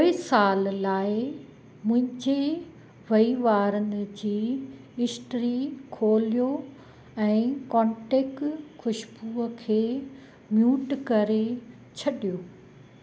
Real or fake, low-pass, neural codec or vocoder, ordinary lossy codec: real; none; none; none